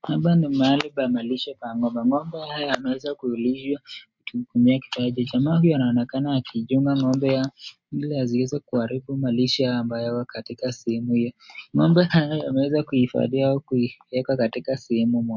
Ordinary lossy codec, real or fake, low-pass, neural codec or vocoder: MP3, 48 kbps; real; 7.2 kHz; none